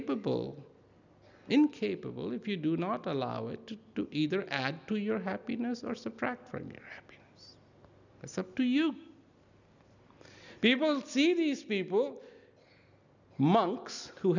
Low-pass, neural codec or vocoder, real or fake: 7.2 kHz; none; real